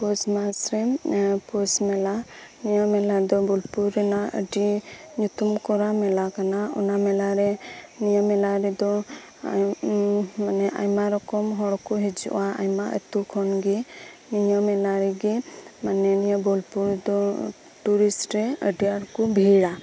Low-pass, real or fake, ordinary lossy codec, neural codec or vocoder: none; real; none; none